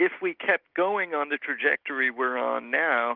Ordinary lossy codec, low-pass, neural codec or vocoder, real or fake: Opus, 32 kbps; 5.4 kHz; none; real